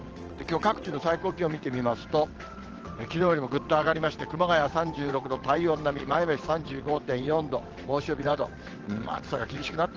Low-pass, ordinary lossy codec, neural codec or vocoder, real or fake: 7.2 kHz; Opus, 16 kbps; vocoder, 22.05 kHz, 80 mel bands, WaveNeXt; fake